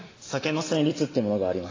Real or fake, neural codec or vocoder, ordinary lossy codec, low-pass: real; none; AAC, 32 kbps; 7.2 kHz